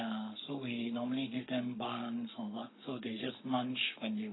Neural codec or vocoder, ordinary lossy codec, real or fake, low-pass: codec, 44.1 kHz, 7.8 kbps, Pupu-Codec; AAC, 16 kbps; fake; 7.2 kHz